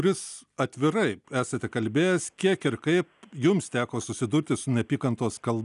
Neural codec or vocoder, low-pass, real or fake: none; 10.8 kHz; real